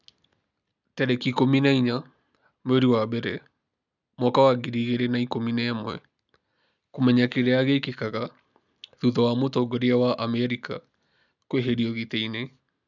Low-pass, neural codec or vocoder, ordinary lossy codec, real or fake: 7.2 kHz; none; none; real